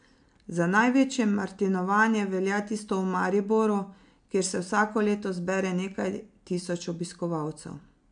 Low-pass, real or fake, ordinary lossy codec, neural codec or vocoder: 9.9 kHz; real; MP3, 64 kbps; none